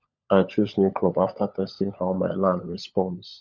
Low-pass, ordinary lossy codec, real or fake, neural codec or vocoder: 7.2 kHz; none; fake; codec, 16 kHz, 16 kbps, FunCodec, trained on LibriTTS, 50 frames a second